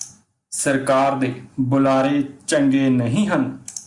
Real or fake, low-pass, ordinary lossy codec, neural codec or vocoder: real; 10.8 kHz; Opus, 64 kbps; none